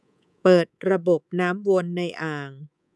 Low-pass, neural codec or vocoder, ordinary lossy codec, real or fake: none; codec, 24 kHz, 3.1 kbps, DualCodec; none; fake